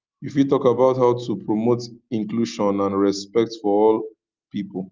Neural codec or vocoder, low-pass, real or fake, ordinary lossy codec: none; 7.2 kHz; real; Opus, 32 kbps